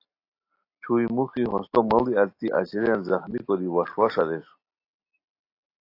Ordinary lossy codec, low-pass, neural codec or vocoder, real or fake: AAC, 32 kbps; 5.4 kHz; none; real